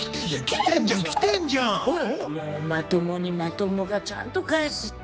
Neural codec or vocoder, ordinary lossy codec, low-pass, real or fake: codec, 16 kHz, 2 kbps, X-Codec, HuBERT features, trained on general audio; none; none; fake